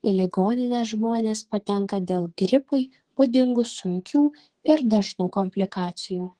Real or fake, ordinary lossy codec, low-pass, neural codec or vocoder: fake; Opus, 16 kbps; 10.8 kHz; codec, 32 kHz, 1.9 kbps, SNAC